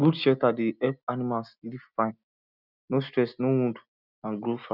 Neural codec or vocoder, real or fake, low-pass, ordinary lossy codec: none; real; 5.4 kHz; none